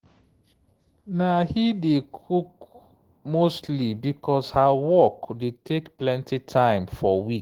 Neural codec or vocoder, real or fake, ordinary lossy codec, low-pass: autoencoder, 48 kHz, 128 numbers a frame, DAC-VAE, trained on Japanese speech; fake; Opus, 16 kbps; 19.8 kHz